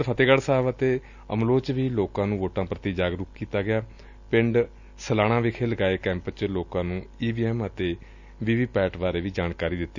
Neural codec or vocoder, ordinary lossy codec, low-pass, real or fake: none; none; 7.2 kHz; real